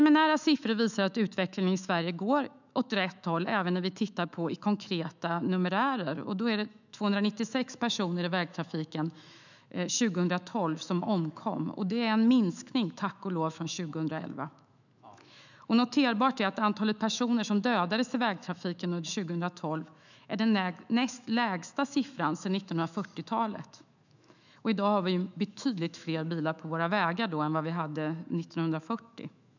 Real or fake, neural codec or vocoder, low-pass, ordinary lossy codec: fake; autoencoder, 48 kHz, 128 numbers a frame, DAC-VAE, trained on Japanese speech; 7.2 kHz; none